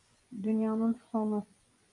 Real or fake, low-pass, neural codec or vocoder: fake; 10.8 kHz; vocoder, 24 kHz, 100 mel bands, Vocos